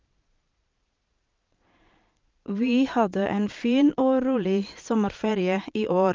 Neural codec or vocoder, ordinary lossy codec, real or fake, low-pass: vocoder, 22.05 kHz, 80 mel bands, Vocos; Opus, 32 kbps; fake; 7.2 kHz